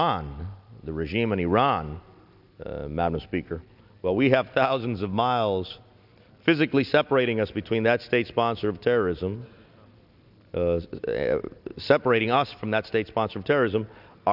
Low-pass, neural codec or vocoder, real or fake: 5.4 kHz; none; real